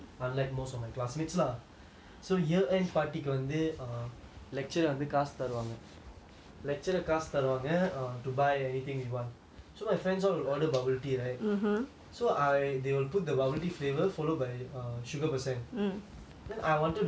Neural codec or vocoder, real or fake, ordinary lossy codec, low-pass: none; real; none; none